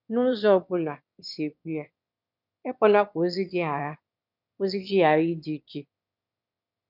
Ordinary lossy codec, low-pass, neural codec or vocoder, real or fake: none; 5.4 kHz; autoencoder, 22.05 kHz, a latent of 192 numbers a frame, VITS, trained on one speaker; fake